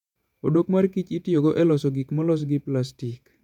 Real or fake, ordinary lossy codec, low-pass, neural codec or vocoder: fake; none; 19.8 kHz; vocoder, 48 kHz, 128 mel bands, Vocos